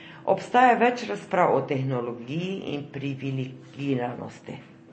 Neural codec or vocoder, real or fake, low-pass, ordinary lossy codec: none; real; 9.9 kHz; MP3, 32 kbps